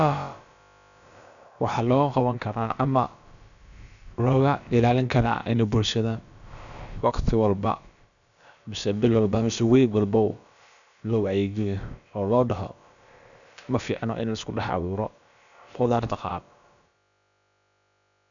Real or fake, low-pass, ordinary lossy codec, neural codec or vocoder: fake; 7.2 kHz; none; codec, 16 kHz, about 1 kbps, DyCAST, with the encoder's durations